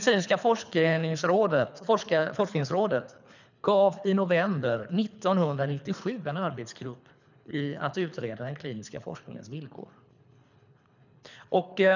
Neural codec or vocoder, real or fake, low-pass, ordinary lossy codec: codec, 24 kHz, 3 kbps, HILCodec; fake; 7.2 kHz; none